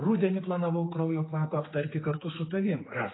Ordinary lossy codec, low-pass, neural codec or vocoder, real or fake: AAC, 16 kbps; 7.2 kHz; codec, 16 kHz, 4 kbps, X-Codec, HuBERT features, trained on general audio; fake